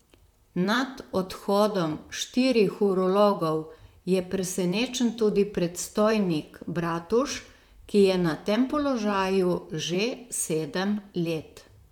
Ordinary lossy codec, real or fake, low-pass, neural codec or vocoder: none; fake; 19.8 kHz; vocoder, 44.1 kHz, 128 mel bands, Pupu-Vocoder